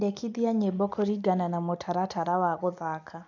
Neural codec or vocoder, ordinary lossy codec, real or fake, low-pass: none; none; real; 7.2 kHz